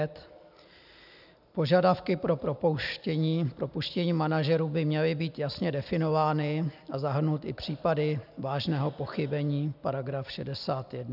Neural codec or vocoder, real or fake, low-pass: none; real; 5.4 kHz